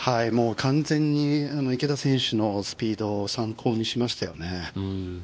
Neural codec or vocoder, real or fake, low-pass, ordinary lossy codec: codec, 16 kHz, 2 kbps, X-Codec, WavLM features, trained on Multilingual LibriSpeech; fake; none; none